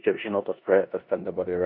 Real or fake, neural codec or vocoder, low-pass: fake; codec, 16 kHz in and 24 kHz out, 0.9 kbps, LongCat-Audio-Codec, four codebook decoder; 5.4 kHz